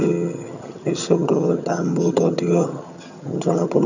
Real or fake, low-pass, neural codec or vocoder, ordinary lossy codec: fake; 7.2 kHz; vocoder, 22.05 kHz, 80 mel bands, HiFi-GAN; none